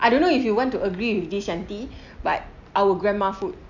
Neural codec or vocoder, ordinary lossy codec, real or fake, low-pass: none; none; real; 7.2 kHz